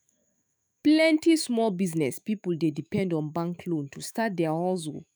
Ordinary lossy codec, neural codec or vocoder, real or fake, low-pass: none; autoencoder, 48 kHz, 128 numbers a frame, DAC-VAE, trained on Japanese speech; fake; none